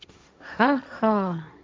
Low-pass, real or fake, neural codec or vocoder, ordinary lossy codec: none; fake; codec, 16 kHz, 1.1 kbps, Voila-Tokenizer; none